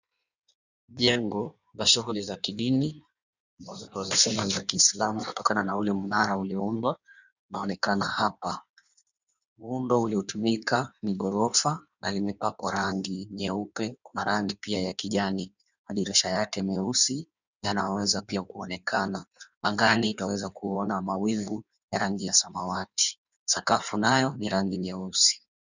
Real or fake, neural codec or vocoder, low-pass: fake; codec, 16 kHz in and 24 kHz out, 1.1 kbps, FireRedTTS-2 codec; 7.2 kHz